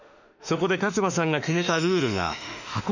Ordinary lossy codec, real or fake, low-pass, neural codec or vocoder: none; fake; 7.2 kHz; autoencoder, 48 kHz, 32 numbers a frame, DAC-VAE, trained on Japanese speech